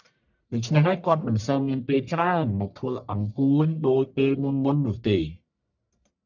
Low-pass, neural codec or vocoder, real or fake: 7.2 kHz; codec, 44.1 kHz, 1.7 kbps, Pupu-Codec; fake